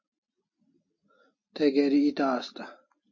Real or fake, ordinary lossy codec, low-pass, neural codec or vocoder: real; MP3, 32 kbps; 7.2 kHz; none